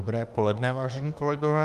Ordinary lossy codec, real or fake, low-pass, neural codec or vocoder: Opus, 32 kbps; fake; 14.4 kHz; autoencoder, 48 kHz, 32 numbers a frame, DAC-VAE, trained on Japanese speech